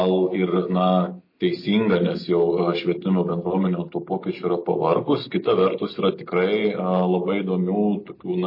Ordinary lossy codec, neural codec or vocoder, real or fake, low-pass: MP3, 24 kbps; none; real; 5.4 kHz